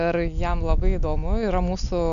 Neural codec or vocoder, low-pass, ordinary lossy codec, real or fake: none; 7.2 kHz; AAC, 96 kbps; real